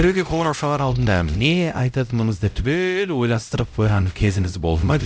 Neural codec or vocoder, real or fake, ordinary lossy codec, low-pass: codec, 16 kHz, 0.5 kbps, X-Codec, HuBERT features, trained on LibriSpeech; fake; none; none